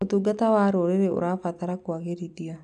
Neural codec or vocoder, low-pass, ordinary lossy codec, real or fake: none; 10.8 kHz; none; real